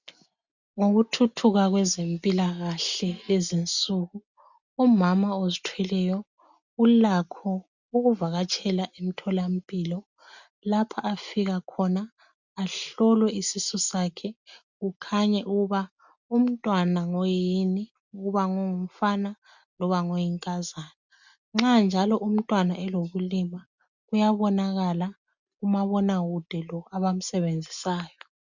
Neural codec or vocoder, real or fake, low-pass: none; real; 7.2 kHz